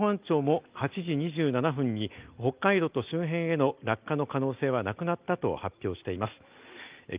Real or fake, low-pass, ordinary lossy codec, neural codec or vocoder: real; 3.6 kHz; Opus, 24 kbps; none